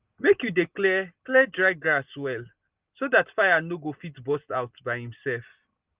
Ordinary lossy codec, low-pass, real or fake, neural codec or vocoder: Opus, 32 kbps; 3.6 kHz; real; none